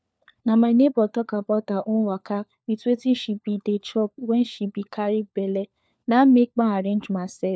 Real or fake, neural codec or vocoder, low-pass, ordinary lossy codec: fake; codec, 16 kHz, 4 kbps, FunCodec, trained on LibriTTS, 50 frames a second; none; none